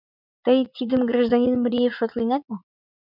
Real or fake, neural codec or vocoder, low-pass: fake; vocoder, 44.1 kHz, 80 mel bands, Vocos; 5.4 kHz